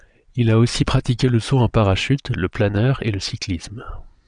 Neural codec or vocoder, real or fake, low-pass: vocoder, 22.05 kHz, 80 mel bands, Vocos; fake; 9.9 kHz